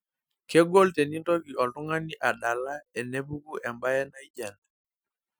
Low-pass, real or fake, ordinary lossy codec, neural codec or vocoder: none; real; none; none